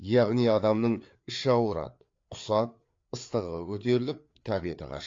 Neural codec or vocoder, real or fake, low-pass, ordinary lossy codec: codec, 16 kHz, 4 kbps, FreqCodec, larger model; fake; 7.2 kHz; AAC, 48 kbps